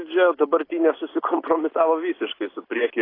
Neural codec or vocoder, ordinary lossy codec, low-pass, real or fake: none; AAC, 24 kbps; 5.4 kHz; real